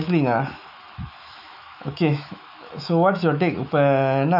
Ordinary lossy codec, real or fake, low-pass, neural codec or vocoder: none; fake; 5.4 kHz; autoencoder, 48 kHz, 128 numbers a frame, DAC-VAE, trained on Japanese speech